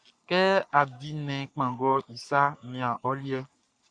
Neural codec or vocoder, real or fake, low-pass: codec, 44.1 kHz, 3.4 kbps, Pupu-Codec; fake; 9.9 kHz